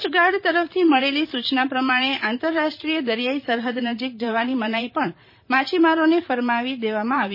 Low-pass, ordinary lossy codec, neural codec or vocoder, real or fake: 5.4 kHz; MP3, 24 kbps; vocoder, 44.1 kHz, 128 mel bands, Pupu-Vocoder; fake